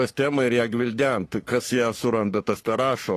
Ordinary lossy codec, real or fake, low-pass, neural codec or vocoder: AAC, 48 kbps; fake; 14.4 kHz; codec, 44.1 kHz, 3.4 kbps, Pupu-Codec